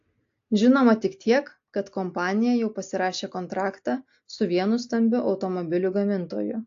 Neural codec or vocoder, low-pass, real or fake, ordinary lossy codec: none; 7.2 kHz; real; AAC, 48 kbps